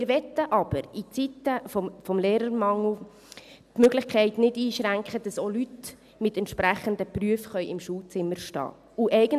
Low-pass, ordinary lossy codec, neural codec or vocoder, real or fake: 14.4 kHz; none; none; real